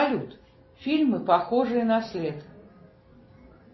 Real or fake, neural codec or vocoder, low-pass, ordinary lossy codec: real; none; 7.2 kHz; MP3, 24 kbps